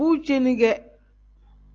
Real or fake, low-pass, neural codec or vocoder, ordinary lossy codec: real; 7.2 kHz; none; Opus, 32 kbps